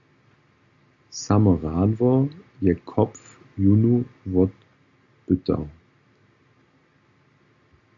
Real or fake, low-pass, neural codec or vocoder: real; 7.2 kHz; none